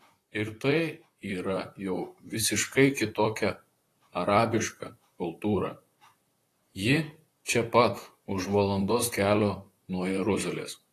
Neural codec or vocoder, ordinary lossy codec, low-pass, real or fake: vocoder, 44.1 kHz, 128 mel bands, Pupu-Vocoder; AAC, 48 kbps; 14.4 kHz; fake